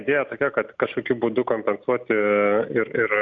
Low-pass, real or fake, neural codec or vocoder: 7.2 kHz; real; none